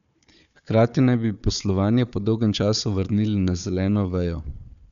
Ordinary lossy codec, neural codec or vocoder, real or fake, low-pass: none; codec, 16 kHz, 4 kbps, FunCodec, trained on Chinese and English, 50 frames a second; fake; 7.2 kHz